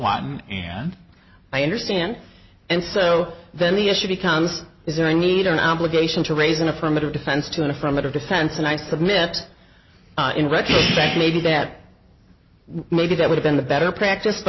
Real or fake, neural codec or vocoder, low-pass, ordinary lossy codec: real; none; 7.2 kHz; MP3, 24 kbps